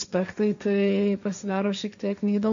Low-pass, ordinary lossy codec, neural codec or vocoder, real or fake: 7.2 kHz; AAC, 64 kbps; codec, 16 kHz, 1.1 kbps, Voila-Tokenizer; fake